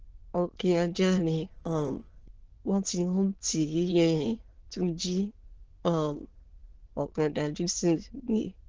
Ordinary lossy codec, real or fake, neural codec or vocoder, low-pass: Opus, 16 kbps; fake; autoencoder, 22.05 kHz, a latent of 192 numbers a frame, VITS, trained on many speakers; 7.2 kHz